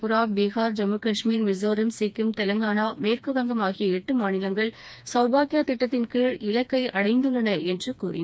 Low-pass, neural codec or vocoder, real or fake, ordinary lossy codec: none; codec, 16 kHz, 2 kbps, FreqCodec, smaller model; fake; none